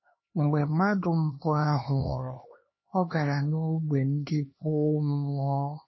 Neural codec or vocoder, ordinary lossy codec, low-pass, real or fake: codec, 16 kHz, 2 kbps, X-Codec, HuBERT features, trained on LibriSpeech; MP3, 24 kbps; 7.2 kHz; fake